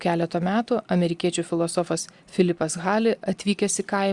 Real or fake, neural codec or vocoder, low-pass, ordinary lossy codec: real; none; 10.8 kHz; Opus, 64 kbps